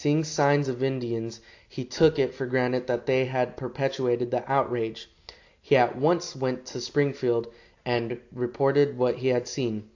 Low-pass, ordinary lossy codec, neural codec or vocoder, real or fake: 7.2 kHz; AAC, 48 kbps; none; real